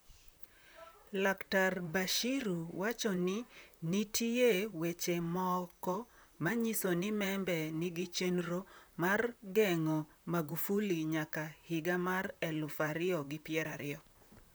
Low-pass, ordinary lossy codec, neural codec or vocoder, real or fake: none; none; vocoder, 44.1 kHz, 128 mel bands, Pupu-Vocoder; fake